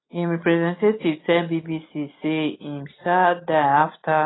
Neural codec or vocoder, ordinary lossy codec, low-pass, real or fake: none; AAC, 16 kbps; 7.2 kHz; real